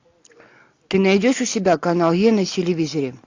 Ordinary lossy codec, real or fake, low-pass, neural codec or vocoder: AAC, 48 kbps; real; 7.2 kHz; none